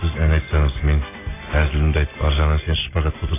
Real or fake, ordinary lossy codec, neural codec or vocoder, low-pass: real; AAC, 16 kbps; none; 3.6 kHz